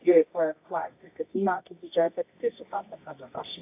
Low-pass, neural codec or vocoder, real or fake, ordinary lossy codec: 3.6 kHz; codec, 24 kHz, 0.9 kbps, WavTokenizer, medium music audio release; fake; AAC, 24 kbps